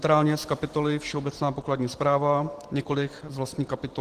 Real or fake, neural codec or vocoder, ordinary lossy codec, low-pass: real; none; Opus, 16 kbps; 14.4 kHz